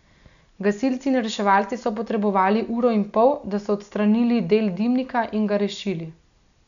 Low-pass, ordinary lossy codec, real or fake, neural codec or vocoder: 7.2 kHz; MP3, 96 kbps; real; none